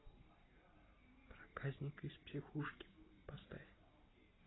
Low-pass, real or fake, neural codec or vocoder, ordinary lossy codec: 7.2 kHz; real; none; AAC, 16 kbps